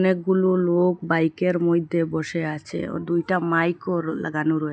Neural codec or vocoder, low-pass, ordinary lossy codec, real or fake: none; none; none; real